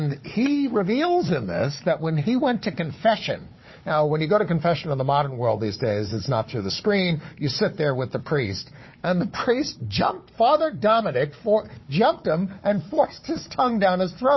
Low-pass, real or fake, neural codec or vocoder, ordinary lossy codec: 7.2 kHz; fake; codec, 16 kHz, 4 kbps, FunCodec, trained on Chinese and English, 50 frames a second; MP3, 24 kbps